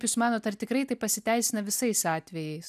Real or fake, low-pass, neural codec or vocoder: real; 14.4 kHz; none